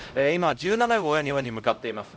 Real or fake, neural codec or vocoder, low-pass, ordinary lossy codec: fake; codec, 16 kHz, 0.5 kbps, X-Codec, HuBERT features, trained on LibriSpeech; none; none